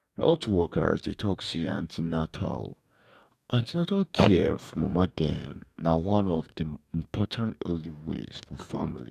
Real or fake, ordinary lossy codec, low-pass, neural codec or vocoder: fake; none; 14.4 kHz; codec, 44.1 kHz, 2.6 kbps, DAC